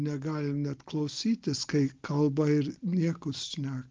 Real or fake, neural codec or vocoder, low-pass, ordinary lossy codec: real; none; 7.2 kHz; Opus, 24 kbps